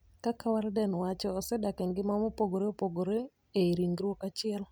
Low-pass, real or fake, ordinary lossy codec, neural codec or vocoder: none; real; none; none